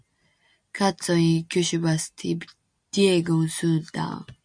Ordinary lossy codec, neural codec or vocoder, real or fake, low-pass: MP3, 96 kbps; none; real; 9.9 kHz